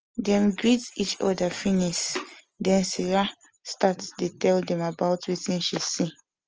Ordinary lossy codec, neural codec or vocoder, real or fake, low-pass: Opus, 24 kbps; none; real; 7.2 kHz